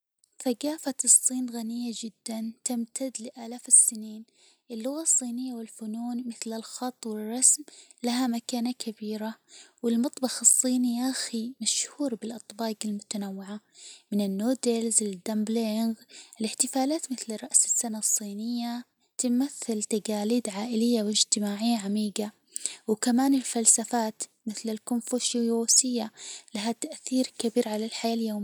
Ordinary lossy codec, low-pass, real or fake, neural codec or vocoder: none; none; real; none